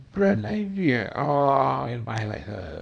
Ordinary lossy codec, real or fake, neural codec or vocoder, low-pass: none; fake; codec, 24 kHz, 0.9 kbps, WavTokenizer, small release; 9.9 kHz